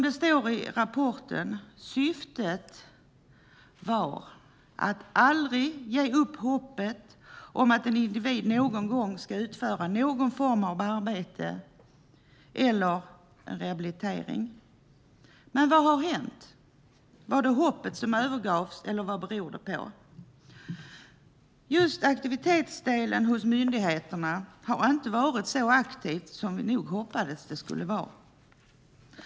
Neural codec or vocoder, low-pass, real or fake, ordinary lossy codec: none; none; real; none